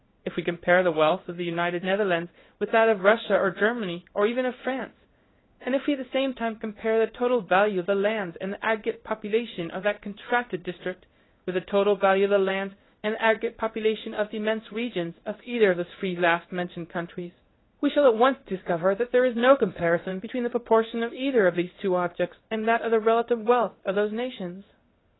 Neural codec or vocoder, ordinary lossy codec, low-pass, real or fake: codec, 16 kHz in and 24 kHz out, 1 kbps, XY-Tokenizer; AAC, 16 kbps; 7.2 kHz; fake